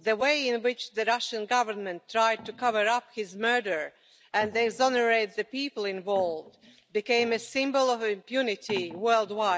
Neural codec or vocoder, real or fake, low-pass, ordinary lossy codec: none; real; none; none